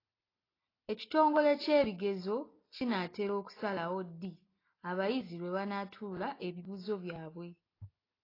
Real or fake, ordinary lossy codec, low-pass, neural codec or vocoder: real; AAC, 24 kbps; 5.4 kHz; none